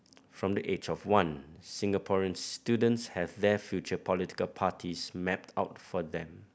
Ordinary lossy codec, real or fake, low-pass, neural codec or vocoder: none; real; none; none